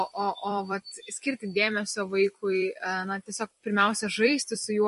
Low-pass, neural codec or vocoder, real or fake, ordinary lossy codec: 14.4 kHz; vocoder, 44.1 kHz, 128 mel bands every 256 samples, BigVGAN v2; fake; MP3, 48 kbps